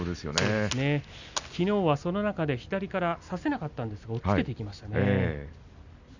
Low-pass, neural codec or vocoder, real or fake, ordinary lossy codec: 7.2 kHz; none; real; none